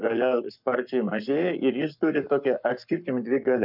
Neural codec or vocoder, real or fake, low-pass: codec, 44.1 kHz, 7.8 kbps, Pupu-Codec; fake; 5.4 kHz